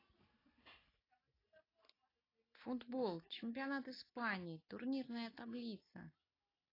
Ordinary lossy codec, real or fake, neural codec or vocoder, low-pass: AAC, 24 kbps; real; none; 5.4 kHz